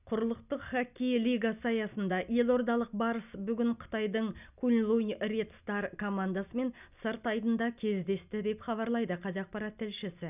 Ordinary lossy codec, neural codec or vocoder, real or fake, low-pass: none; none; real; 3.6 kHz